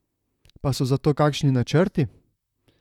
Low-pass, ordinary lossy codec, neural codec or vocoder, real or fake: 19.8 kHz; none; vocoder, 44.1 kHz, 128 mel bands, Pupu-Vocoder; fake